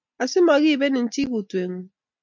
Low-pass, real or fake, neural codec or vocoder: 7.2 kHz; real; none